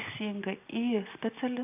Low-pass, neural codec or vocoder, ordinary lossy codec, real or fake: 3.6 kHz; none; AAC, 32 kbps; real